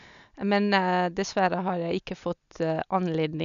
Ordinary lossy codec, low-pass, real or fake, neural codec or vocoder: none; 7.2 kHz; real; none